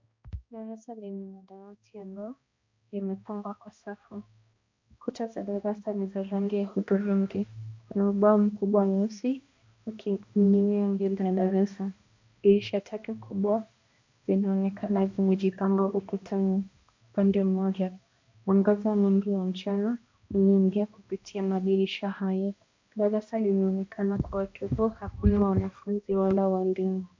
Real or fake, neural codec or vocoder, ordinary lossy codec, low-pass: fake; codec, 16 kHz, 1 kbps, X-Codec, HuBERT features, trained on balanced general audio; MP3, 48 kbps; 7.2 kHz